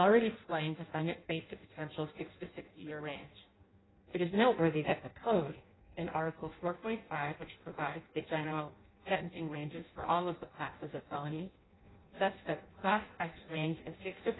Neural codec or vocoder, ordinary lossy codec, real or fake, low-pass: codec, 16 kHz in and 24 kHz out, 0.6 kbps, FireRedTTS-2 codec; AAC, 16 kbps; fake; 7.2 kHz